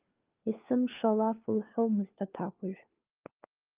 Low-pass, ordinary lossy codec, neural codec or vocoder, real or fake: 3.6 kHz; Opus, 32 kbps; codec, 16 kHz, 4 kbps, FunCodec, trained on LibriTTS, 50 frames a second; fake